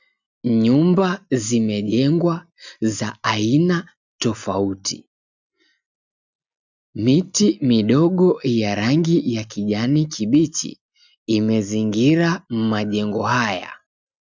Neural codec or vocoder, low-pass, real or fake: none; 7.2 kHz; real